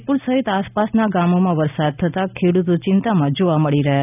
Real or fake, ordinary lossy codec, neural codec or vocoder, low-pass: real; none; none; 3.6 kHz